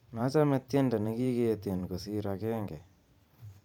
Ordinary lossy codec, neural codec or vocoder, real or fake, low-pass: none; vocoder, 44.1 kHz, 128 mel bands every 512 samples, BigVGAN v2; fake; 19.8 kHz